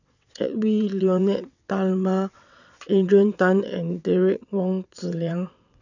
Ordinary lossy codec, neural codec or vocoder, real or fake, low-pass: none; vocoder, 22.05 kHz, 80 mel bands, WaveNeXt; fake; 7.2 kHz